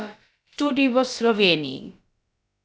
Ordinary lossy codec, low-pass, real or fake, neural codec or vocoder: none; none; fake; codec, 16 kHz, about 1 kbps, DyCAST, with the encoder's durations